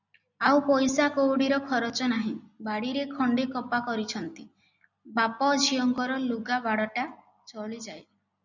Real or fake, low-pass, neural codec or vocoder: real; 7.2 kHz; none